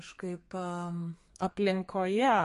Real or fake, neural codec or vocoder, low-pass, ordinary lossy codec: fake; codec, 32 kHz, 1.9 kbps, SNAC; 14.4 kHz; MP3, 48 kbps